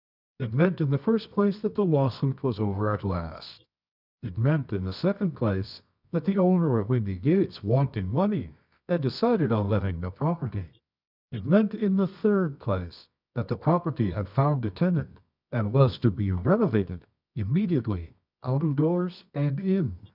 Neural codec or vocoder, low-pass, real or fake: codec, 24 kHz, 0.9 kbps, WavTokenizer, medium music audio release; 5.4 kHz; fake